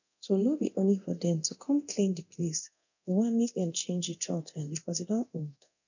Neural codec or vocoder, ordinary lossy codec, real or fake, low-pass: codec, 24 kHz, 0.9 kbps, DualCodec; none; fake; 7.2 kHz